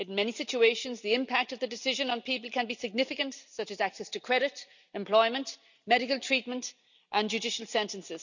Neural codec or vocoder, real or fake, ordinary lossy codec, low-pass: none; real; none; 7.2 kHz